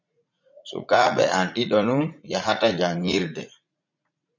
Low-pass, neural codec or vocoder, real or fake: 7.2 kHz; vocoder, 44.1 kHz, 80 mel bands, Vocos; fake